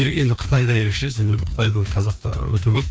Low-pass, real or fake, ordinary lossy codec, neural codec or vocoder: none; fake; none; codec, 16 kHz, 2 kbps, FreqCodec, larger model